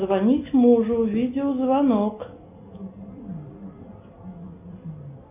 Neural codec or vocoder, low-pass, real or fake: none; 3.6 kHz; real